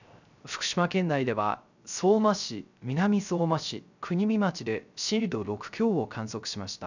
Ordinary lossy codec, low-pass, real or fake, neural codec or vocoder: none; 7.2 kHz; fake; codec, 16 kHz, 0.3 kbps, FocalCodec